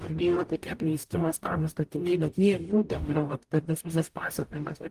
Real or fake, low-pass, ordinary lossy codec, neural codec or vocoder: fake; 14.4 kHz; Opus, 24 kbps; codec, 44.1 kHz, 0.9 kbps, DAC